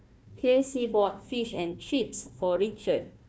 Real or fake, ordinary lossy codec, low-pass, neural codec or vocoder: fake; none; none; codec, 16 kHz, 1 kbps, FunCodec, trained on Chinese and English, 50 frames a second